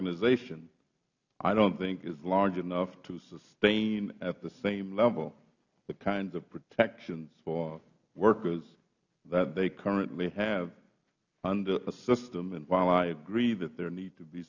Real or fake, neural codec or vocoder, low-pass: real; none; 7.2 kHz